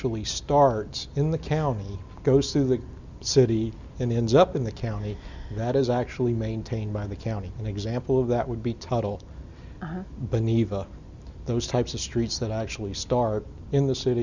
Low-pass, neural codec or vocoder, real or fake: 7.2 kHz; none; real